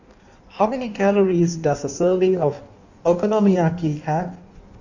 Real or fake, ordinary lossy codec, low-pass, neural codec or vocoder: fake; none; 7.2 kHz; codec, 16 kHz in and 24 kHz out, 1.1 kbps, FireRedTTS-2 codec